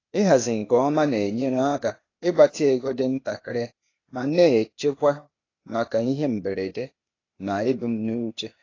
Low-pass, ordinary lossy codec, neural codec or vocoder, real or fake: 7.2 kHz; AAC, 32 kbps; codec, 16 kHz, 0.8 kbps, ZipCodec; fake